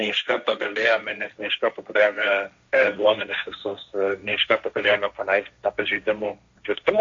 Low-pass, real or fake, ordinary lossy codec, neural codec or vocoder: 7.2 kHz; fake; AAC, 64 kbps; codec, 16 kHz, 1.1 kbps, Voila-Tokenizer